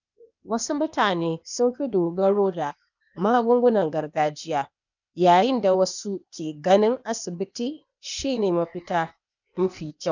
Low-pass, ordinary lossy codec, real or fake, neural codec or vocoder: 7.2 kHz; none; fake; codec, 16 kHz, 0.8 kbps, ZipCodec